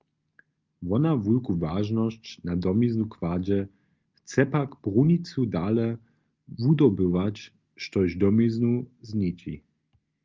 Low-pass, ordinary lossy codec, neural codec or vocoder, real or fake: 7.2 kHz; Opus, 24 kbps; none; real